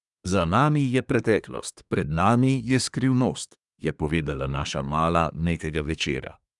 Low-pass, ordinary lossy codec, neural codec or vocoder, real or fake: 10.8 kHz; none; codec, 24 kHz, 1 kbps, SNAC; fake